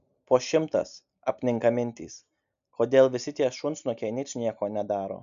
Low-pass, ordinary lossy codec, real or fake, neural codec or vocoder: 7.2 kHz; MP3, 96 kbps; real; none